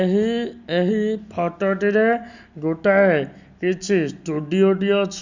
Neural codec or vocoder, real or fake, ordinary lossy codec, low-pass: none; real; Opus, 64 kbps; 7.2 kHz